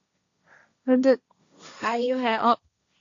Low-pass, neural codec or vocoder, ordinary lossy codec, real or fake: 7.2 kHz; codec, 16 kHz, 1.1 kbps, Voila-Tokenizer; AAC, 64 kbps; fake